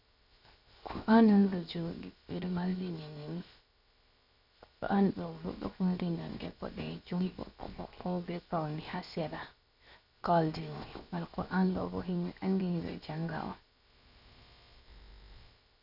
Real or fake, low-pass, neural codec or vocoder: fake; 5.4 kHz; codec, 16 kHz, 0.7 kbps, FocalCodec